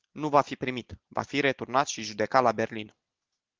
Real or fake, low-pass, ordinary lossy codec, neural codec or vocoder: real; 7.2 kHz; Opus, 16 kbps; none